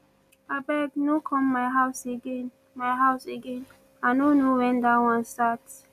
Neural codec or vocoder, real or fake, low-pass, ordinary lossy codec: none; real; 14.4 kHz; none